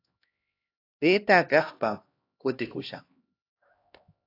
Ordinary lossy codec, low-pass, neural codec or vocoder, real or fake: AAC, 32 kbps; 5.4 kHz; codec, 16 kHz, 1 kbps, X-Codec, HuBERT features, trained on LibriSpeech; fake